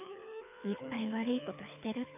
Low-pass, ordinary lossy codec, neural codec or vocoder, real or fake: 3.6 kHz; AAC, 32 kbps; codec, 24 kHz, 6 kbps, HILCodec; fake